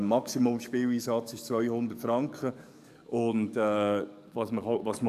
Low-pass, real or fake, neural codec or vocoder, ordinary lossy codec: 14.4 kHz; fake; codec, 44.1 kHz, 7.8 kbps, DAC; none